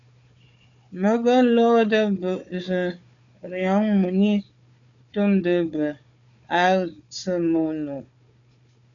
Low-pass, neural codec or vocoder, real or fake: 7.2 kHz; codec, 16 kHz, 4 kbps, FunCodec, trained on Chinese and English, 50 frames a second; fake